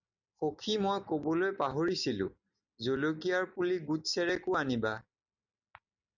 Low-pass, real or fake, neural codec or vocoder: 7.2 kHz; real; none